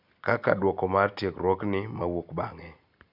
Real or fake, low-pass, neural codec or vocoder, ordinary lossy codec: real; 5.4 kHz; none; none